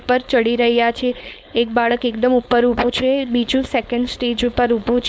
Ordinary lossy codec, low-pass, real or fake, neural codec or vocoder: none; none; fake; codec, 16 kHz, 4.8 kbps, FACodec